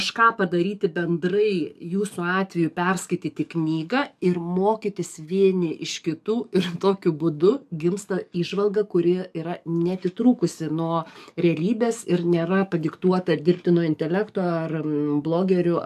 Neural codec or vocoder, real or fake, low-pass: codec, 44.1 kHz, 7.8 kbps, DAC; fake; 14.4 kHz